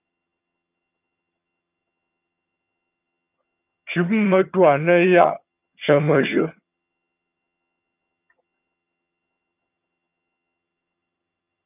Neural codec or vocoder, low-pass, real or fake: vocoder, 22.05 kHz, 80 mel bands, HiFi-GAN; 3.6 kHz; fake